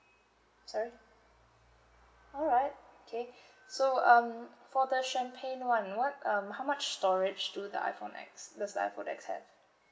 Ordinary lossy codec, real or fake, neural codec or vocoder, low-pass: none; real; none; none